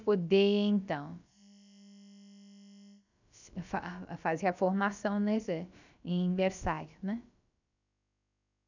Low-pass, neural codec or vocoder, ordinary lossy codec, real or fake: 7.2 kHz; codec, 16 kHz, about 1 kbps, DyCAST, with the encoder's durations; none; fake